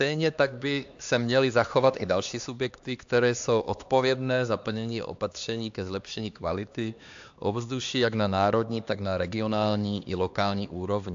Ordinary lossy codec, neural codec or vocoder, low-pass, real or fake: AAC, 64 kbps; codec, 16 kHz, 2 kbps, X-Codec, HuBERT features, trained on LibriSpeech; 7.2 kHz; fake